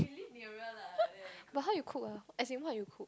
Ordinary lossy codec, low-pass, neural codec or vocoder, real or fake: none; none; none; real